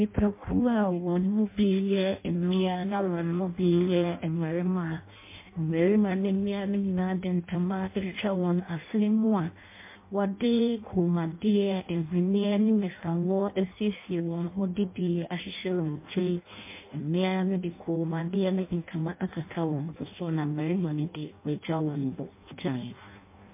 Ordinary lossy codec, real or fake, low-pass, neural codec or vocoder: MP3, 24 kbps; fake; 3.6 kHz; codec, 16 kHz in and 24 kHz out, 0.6 kbps, FireRedTTS-2 codec